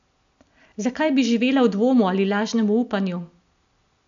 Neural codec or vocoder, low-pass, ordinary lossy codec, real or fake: none; 7.2 kHz; MP3, 64 kbps; real